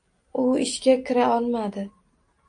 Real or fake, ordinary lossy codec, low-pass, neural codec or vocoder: real; AAC, 48 kbps; 9.9 kHz; none